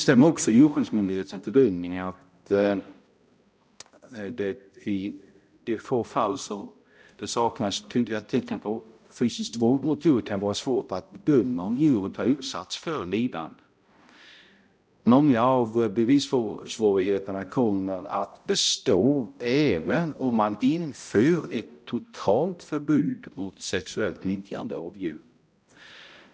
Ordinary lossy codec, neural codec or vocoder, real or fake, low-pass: none; codec, 16 kHz, 0.5 kbps, X-Codec, HuBERT features, trained on balanced general audio; fake; none